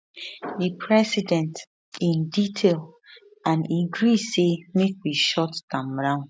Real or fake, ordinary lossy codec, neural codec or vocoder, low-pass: real; none; none; none